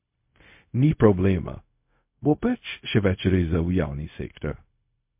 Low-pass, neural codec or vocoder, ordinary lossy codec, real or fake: 3.6 kHz; codec, 16 kHz, 0.4 kbps, LongCat-Audio-Codec; MP3, 32 kbps; fake